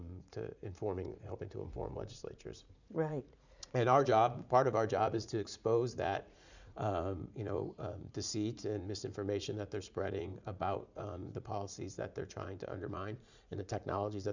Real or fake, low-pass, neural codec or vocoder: fake; 7.2 kHz; vocoder, 44.1 kHz, 80 mel bands, Vocos